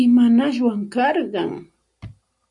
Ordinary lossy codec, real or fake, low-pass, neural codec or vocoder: MP3, 64 kbps; real; 10.8 kHz; none